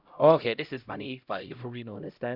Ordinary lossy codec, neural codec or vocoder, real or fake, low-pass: Opus, 64 kbps; codec, 16 kHz, 0.5 kbps, X-Codec, HuBERT features, trained on LibriSpeech; fake; 5.4 kHz